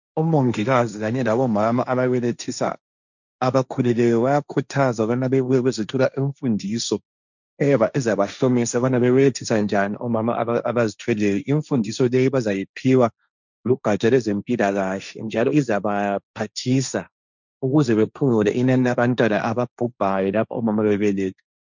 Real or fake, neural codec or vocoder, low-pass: fake; codec, 16 kHz, 1.1 kbps, Voila-Tokenizer; 7.2 kHz